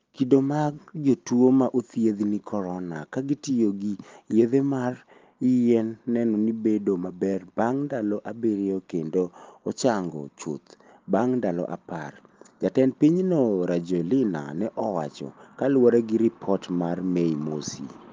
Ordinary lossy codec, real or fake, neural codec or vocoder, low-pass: Opus, 32 kbps; real; none; 7.2 kHz